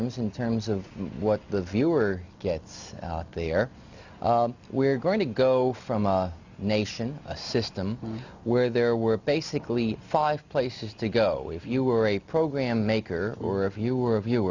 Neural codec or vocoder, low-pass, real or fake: none; 7.2 kHz; real